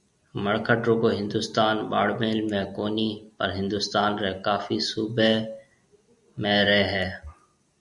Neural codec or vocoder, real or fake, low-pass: none; real; 10.8 kHz